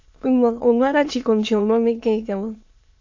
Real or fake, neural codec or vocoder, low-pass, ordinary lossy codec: fake; autoencoder, 22.05 kHz, a latent of 192 numbers a frame, VITS, trained on many speakers; 7.2 kHz; AAC, 48 kbps